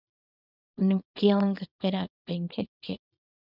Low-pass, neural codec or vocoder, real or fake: 5.4 kHz; codec, 24 kHz, 0.9 kbps, WavTokenizer, small release; fake